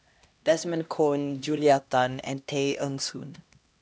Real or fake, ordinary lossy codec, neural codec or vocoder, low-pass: fake; none; codec, 16 kHz, 1 kbps, X-Codec, HuBERT features, trained on LibriSpeech; none